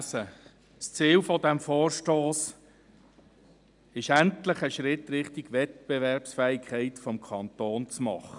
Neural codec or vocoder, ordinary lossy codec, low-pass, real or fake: none; none; 10.8 kHz; real